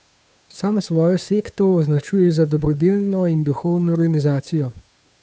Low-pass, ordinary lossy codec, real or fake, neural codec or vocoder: none; none; fake; codec, 16 kHz, 2 kbps, FunCodec, trained on Chinese and English, 25 frames a second